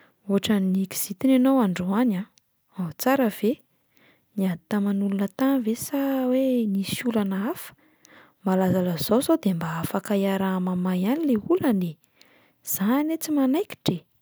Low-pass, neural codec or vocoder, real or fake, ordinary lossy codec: none; none; real; none